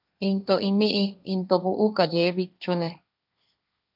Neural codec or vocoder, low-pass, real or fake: codec, 16 kHz, 1.1 kbps, Voila-Tokenizer; 5.4 kHz; fake